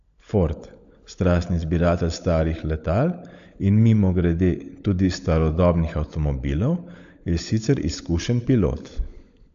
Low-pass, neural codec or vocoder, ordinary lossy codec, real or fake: 7.2 kHz; codec, 16 kHz, 16 kbps, FunCodec, trained on LibriTTS, 50 frames a second; AAC, 64 kbps; fake